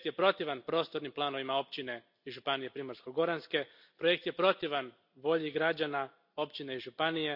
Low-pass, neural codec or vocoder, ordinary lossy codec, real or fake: 5.4 kHz; none; none; real